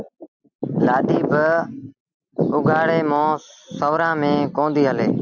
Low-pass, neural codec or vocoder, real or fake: 7.2 kHz; none; real